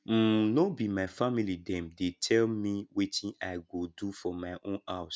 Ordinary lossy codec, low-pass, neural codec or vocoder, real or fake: none; none; none; real